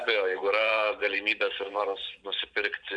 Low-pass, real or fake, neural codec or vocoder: 9.9 kHz; fake; vocoder, 44.1 kHz, 128 mel bands every 512 samples, BigVGAN v2